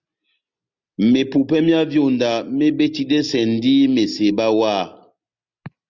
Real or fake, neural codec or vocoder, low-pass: real; none; 7.2 kHz